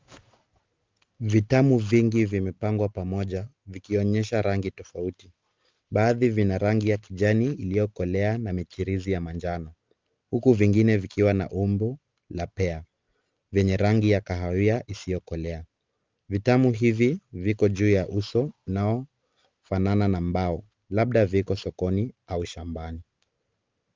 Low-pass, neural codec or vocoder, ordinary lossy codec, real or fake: 7.2 kHz; none; Opus, 32 kbps; real